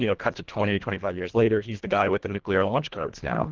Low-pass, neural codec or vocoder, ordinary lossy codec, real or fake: 7.2 kHz; codec, 24 kHz, 1.5 kbps, HILCodec; Opus, 16 kbps; fake